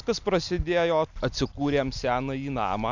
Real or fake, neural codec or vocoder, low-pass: real; none; 7.2 kHz